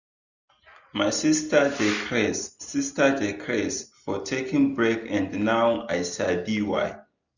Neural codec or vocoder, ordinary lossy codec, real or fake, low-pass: none; none; real; 7.2 kHz